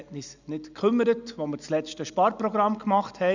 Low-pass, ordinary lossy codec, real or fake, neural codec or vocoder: 7.2 kHz; none; real; none